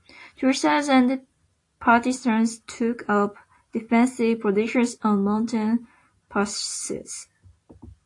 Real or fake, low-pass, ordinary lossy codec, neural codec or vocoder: real; 10.8 kHz; AAC, 48 kbps; none